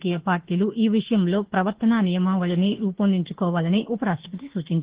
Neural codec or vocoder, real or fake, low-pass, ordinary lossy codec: autoencoder, 48 kHz, 32 numbers a frame, DAC-VAE, trained on Japanese speech; fake; 3.6 kHz; Opus, 16 kbps